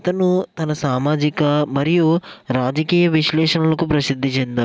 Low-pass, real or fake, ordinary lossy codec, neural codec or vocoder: none; real; none; none